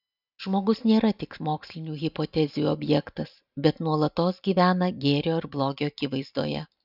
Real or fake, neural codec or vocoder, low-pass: real; none; 5.4 kHz